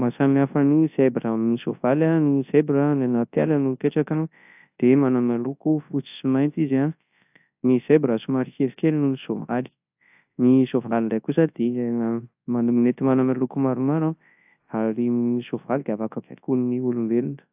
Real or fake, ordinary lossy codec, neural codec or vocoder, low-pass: fake; AAC, 32 kbps; codec, 24 kHz, 0.9 kbps, WavTokenizer, large speech release; 3.6 kHz